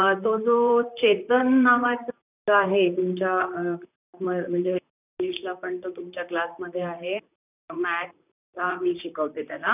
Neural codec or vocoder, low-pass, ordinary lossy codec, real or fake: vocoder, 44.1 kHz, 128 mel bands, Pupu-Vocoder; 3.6 kHz; none; fake